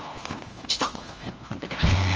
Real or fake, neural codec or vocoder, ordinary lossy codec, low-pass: fake; codec, 16 kHz, 1 kbps, FunCodec, trained on LibriTTS, 50 frames a second; Opus, 24 kbps; 7.2 kHz